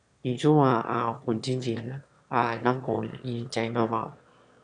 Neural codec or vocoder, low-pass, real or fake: autoencoder, 22.05 kHz, a latent of 192 numbers a frame, VITS, trained on one speaker; 9.9 kHz; fake